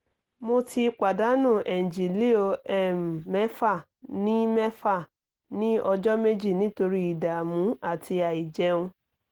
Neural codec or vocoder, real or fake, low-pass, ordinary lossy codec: none; real; 19.8 kHz; Opus, 16 kbps